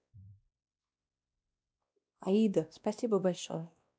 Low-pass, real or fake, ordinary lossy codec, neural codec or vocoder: none; fake; none; codec, 16 kHz, 1 kbps, X-Codec, WavLM features, trained on Multilingual LibriSpeech